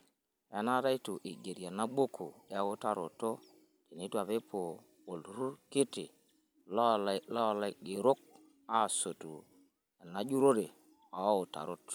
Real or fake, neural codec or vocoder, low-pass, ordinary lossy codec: real; none; none; none